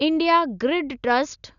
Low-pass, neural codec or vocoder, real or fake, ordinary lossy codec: 7.2 kHz; none; real; none